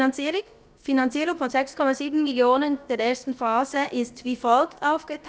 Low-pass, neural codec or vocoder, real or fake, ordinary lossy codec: none; codec, 16 kHz, about 1 kbps, DyCAST, with the encoder's durations; fake; none